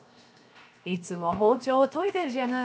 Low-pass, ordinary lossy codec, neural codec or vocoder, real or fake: none; none; codec, 16 kHz, 0.7 kbps, FocalCodec; fake